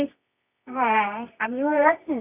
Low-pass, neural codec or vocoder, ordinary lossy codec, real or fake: 3.6 kHz; codec, 24 kHz, 0.9 kbps, WavTokenizer, medium music audio release; none; fake